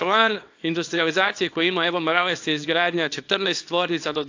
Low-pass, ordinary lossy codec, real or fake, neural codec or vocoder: 7.2 kHz; AAC, 48 kbps; fake; codec, 24 kHz, 0.9 kbps, WavTokenizer, small release